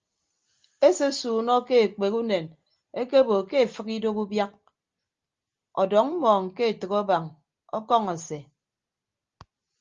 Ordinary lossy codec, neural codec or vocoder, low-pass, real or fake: Opus, 32 kbps; none; 7.2 kHz; real